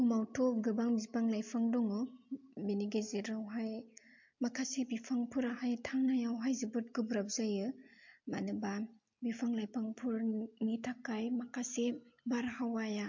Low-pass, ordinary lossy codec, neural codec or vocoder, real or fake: 7.2 kHz; MP3, 48 kbps; none; real